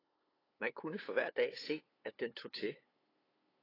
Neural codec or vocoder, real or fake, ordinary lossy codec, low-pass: codec, 16 kHz, 8 kbps, FunCodec, trained on LibriTTS, 25 frames a second; fake; AAC, 24 kbps; 5.4 kHz